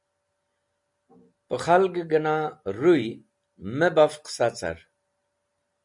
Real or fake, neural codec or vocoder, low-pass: real; none; 10.8 kHz